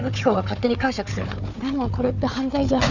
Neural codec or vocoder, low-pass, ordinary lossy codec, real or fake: codec, 16 kHz, 4 kbps, FunCodec, trained on Chinese and English, 50 frames a second; 7.2 kHz; none; fake